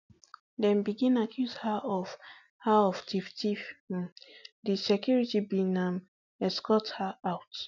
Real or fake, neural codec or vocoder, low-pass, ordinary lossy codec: real; none; 7.2 kHz; none